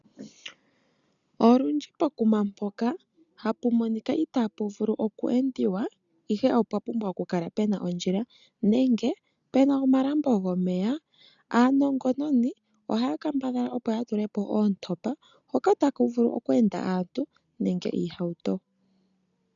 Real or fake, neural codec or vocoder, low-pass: real; none; 7.2 kHz